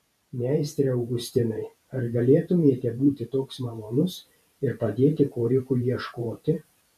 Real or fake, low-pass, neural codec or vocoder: fake; 14.4 kHz; vocoder, 44.1 kHz, 128 mel bands every 512 samples, BigVGAN v2